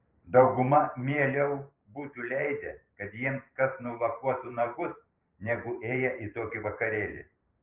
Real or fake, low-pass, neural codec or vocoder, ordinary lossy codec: fake; 3.6 kHz; vocoder, 44.1 kHz, 128 mel bands every 512 samples, BigVGAN v2; Opus, 32 kbps